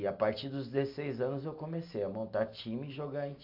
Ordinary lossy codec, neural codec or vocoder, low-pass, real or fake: AAC, 48 kbps; none; 5.4 kHz; real